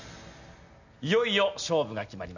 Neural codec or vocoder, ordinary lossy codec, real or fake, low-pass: none; none; real; 7.2 kHz